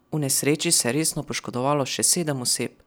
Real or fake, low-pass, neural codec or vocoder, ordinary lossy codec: real; none; none; none